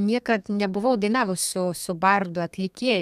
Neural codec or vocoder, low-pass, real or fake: codec, 32 kHz, 1.9 kbps, SNAC; 14.4 kHz; fake